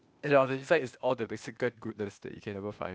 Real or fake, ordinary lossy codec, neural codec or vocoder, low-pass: fake; none; codec, 16 kHz, 0.8 kbps, ZipCodec; none